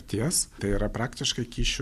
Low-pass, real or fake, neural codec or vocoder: 14.4 kHz; real; none